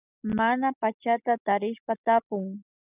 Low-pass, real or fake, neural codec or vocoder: 3.6 kHz; real; none